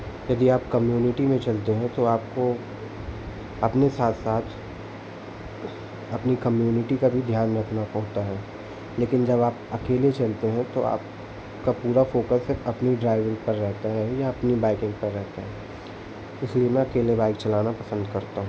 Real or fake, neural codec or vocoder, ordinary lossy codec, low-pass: real; none; none; none